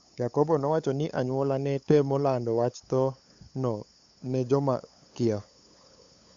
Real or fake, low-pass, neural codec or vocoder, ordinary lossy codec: fake; 7.2 kHz; codec, 16 kHz, 8 kbps, FunCodec, trained on LibriTTS, 25 frames a second; Opus, 64 kbps